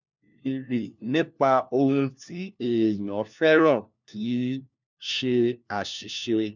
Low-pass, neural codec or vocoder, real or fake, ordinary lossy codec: 7.2 kHz; codec, 16 kHz, 1 kbps, FunCodec, trained on LibriTTS, 50 frames a second; fake; none